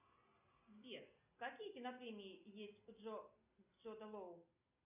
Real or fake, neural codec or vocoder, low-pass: real; none; 3.6 kHz